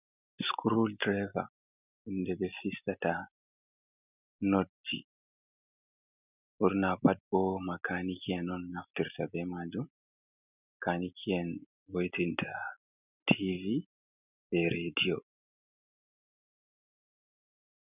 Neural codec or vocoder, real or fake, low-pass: none; real; 3.6 kHz